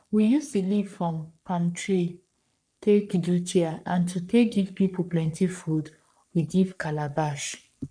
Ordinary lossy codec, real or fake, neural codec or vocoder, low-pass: none; fake; codec, 44.1 kHz, 3.4 kbps, Pupu-Codec; 9.9 kHz